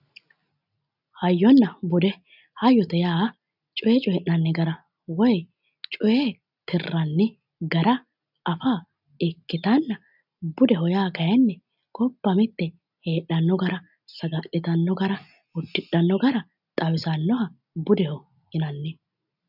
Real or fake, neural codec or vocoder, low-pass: real; none; 5.4 kHz